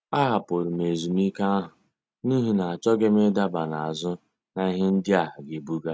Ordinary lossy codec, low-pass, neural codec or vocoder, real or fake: none; none; none; real